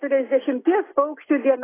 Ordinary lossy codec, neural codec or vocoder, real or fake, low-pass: AAC, 16 kbps; none; real; 3.6 kHz